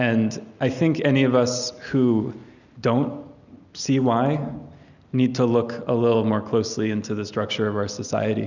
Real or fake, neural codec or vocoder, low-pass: real; none; 7.2 kHz